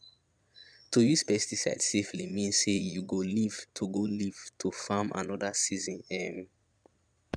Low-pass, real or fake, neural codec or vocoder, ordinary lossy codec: 9.9 kHz; fake; vocoder, 22.05 kHz, 80 mel bands, Vocos; none